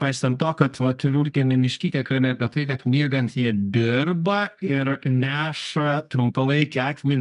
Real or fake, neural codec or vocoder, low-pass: fake; codec, 24 kHz, 0.9 kbps, WavTokenizer, medium music audio release; 10.8 kHz